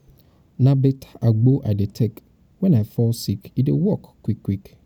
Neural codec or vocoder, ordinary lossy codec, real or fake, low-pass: none; none; real; 19.8 kHz